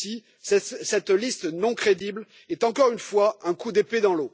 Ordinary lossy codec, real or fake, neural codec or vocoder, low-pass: none; real; none; none